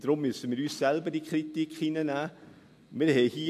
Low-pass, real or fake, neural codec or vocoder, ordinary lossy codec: 14.4 kHz; real; none; MP3, 64 kbps